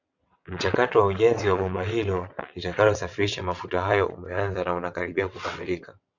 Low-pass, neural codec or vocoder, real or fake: 7.2 kHz; vocoder, 22.05 kHz, 80 mel bands, WaveNeXt; fake